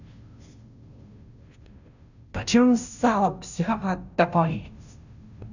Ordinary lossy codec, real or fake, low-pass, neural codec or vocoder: none; fake; 7.2 kHz; codec, 16 kHz, 0.5 kbps, FunCodec, trained on Chinese and English, 25 frames a second